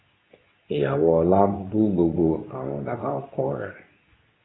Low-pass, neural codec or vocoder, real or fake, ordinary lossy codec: 7.2 kHz; codec, 24 kHz, 0.9 kbps, WavTokenizer, medium speech release version 2; fake; AAC, 16 kbps